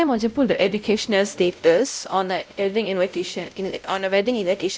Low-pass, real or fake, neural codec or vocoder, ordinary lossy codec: none; fake; codec, 16 kHz, 0.5 kbps, X-Codec, WavLM features, trained on Multilingual LibriSpeech; none